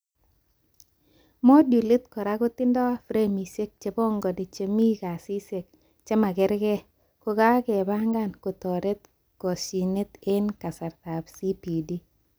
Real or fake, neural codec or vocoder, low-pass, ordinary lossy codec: real; none; none; none